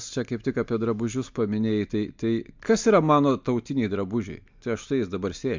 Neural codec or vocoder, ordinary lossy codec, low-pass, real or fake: none; MP3, 64 kbps; 7.2 kHz; real